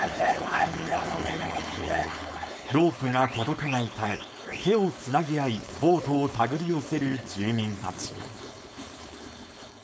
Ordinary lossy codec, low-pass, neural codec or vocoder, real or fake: none; none; codec, 16 kHz, 4.8 kbps, FACodec; fake